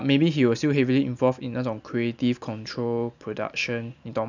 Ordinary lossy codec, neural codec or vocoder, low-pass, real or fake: none; none; 7.2 kHz; real